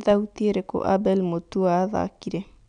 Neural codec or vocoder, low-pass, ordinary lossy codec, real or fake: none; 9.9 kHz; none; real